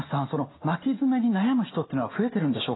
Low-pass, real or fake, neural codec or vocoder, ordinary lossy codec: 7.2 kHz; real; none; AAC, 16 kbps